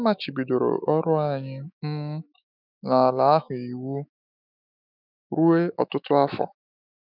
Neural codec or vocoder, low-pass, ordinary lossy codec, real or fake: autoencoder, 48 kHz, 128 numbers a frame, DAC-VAE, trained on Japanese speech; 5.4 kHz; none; fake